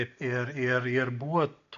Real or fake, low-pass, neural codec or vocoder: real; 7.2 kHz; none